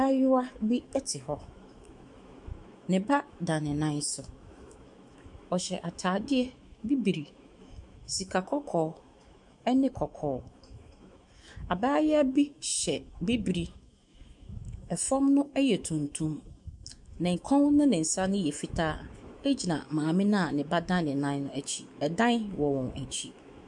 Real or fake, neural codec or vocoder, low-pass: fake; autoencoder, 48 kHz, 128 numbers a frame, DAC-VAE, trained on Japanese speech; 10.8 kHz